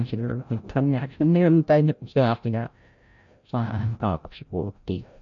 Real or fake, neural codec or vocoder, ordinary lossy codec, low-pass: fake; codec, 16 kHz, 0.5 kbps, FreqCodec, larger model; MP3, 48 kbps; 7.2 kHz